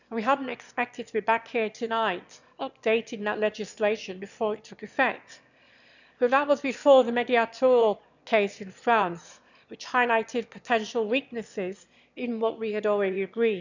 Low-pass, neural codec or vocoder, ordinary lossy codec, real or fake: 7.2 kHz; autoencoder, 22.05 kHz, a latent of 192 numbers a frame, VITS, trained on one speaker; none; fake